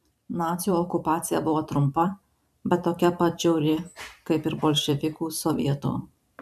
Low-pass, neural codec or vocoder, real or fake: 14.4 kHz; vocoder, 44.1 kHz, 128 mel bands every 512 samples, BigVGAN v2; fake